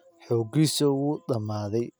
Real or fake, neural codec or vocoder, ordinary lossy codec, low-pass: real; none; none; none